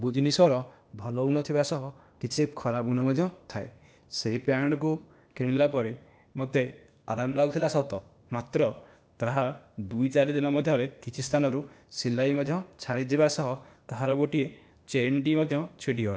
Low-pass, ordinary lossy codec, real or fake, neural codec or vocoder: none; none; fake; codec, 16 kHz, 0.8 kbps, ZipCodec